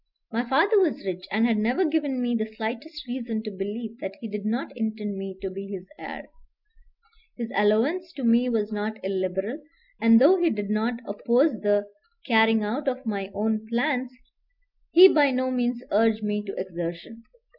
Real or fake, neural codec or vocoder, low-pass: real; none; 5.4 kHz